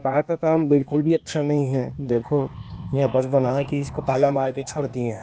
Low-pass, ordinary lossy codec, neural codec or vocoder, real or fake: none; none; codec, 16 kHz, 0.8 kbps, ZipCodec; fake